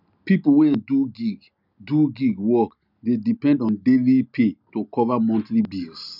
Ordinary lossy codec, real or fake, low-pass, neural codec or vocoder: none; real; 5.4 kHz; none